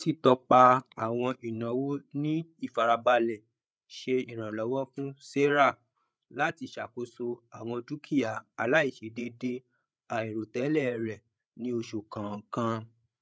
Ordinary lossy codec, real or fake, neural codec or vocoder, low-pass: none; fake; codec, 16 kHz, 8 kbps, FreqCodec, larger model; none